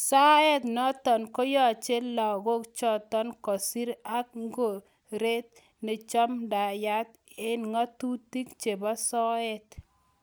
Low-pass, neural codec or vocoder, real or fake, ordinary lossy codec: none; none; real; none